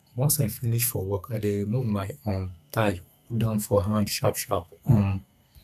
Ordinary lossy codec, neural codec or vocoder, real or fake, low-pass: none; codec, 32 kHz, 1.9 kbps, SNAC; fake; 14.4 kHz